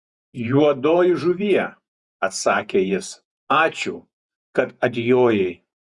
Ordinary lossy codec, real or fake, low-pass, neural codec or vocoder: Opus, 64 kbps; fake; 10.8 kHz; vocoder, 48 kHz, 128 mel bands, Vocos